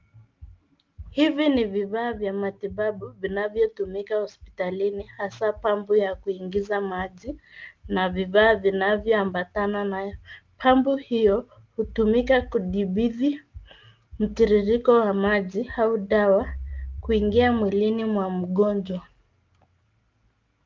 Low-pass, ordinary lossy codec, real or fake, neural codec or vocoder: 7.2 kHz; Opus, 24 kbps; real; none